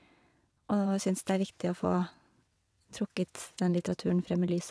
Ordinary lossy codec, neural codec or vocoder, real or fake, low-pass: none; vocoder, 22.05 kHz, 80 mel bands, WaveNeXt; fake; none